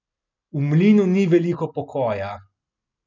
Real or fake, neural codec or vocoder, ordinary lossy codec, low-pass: real; none; none; 7.2 kHz